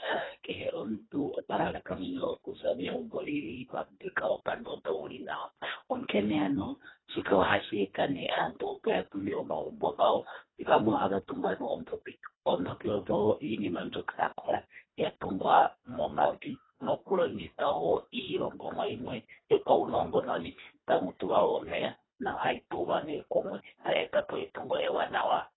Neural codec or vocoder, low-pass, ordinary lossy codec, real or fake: codec, 24 kHz, 1.5 kbps, HILCodec; 7.2 kHz; AAC, 16 kbps; fake